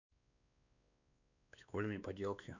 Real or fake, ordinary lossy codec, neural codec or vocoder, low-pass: fake; none; codec, 16 kHz, 2 kbps, X-Codec, WavLM features, trained on Multilingual LibriSpeech; 7.2 kHz